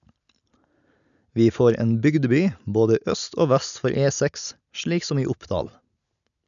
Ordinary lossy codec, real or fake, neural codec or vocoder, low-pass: none; real; none; 7.2 kHz